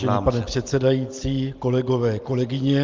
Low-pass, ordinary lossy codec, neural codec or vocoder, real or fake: 7.2 kHz; Opus, 24 kbps; none; real